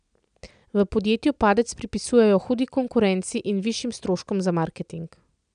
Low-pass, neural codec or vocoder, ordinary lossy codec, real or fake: 9.9 kHz; none; none; real